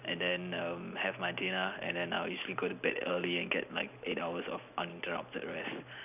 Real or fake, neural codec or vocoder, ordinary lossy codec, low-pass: real; none; none; 3.6 kHz